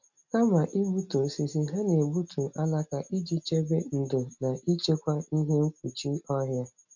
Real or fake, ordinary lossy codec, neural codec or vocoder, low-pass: real; none; none; 7.2 kHz